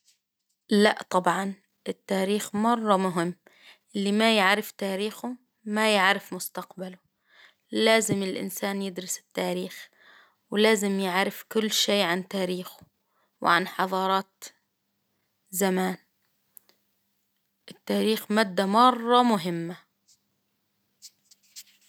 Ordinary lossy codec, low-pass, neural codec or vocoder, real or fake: none; none; none; real